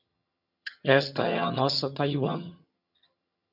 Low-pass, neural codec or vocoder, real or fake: 5.4 kHz; vocoder, 22.05 kHz, 80 mel bands, HiFi-GAN; fake